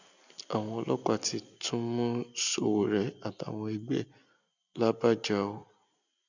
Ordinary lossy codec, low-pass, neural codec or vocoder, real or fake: none; 7.2 kHz; none; real